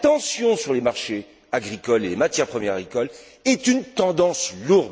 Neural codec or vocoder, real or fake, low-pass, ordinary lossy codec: none; real; none; none